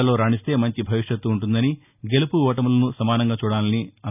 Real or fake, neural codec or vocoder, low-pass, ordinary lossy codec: real; none; 3.6 kHz; none